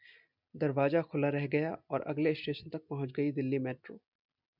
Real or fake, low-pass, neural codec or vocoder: real; 5.4 kHz; none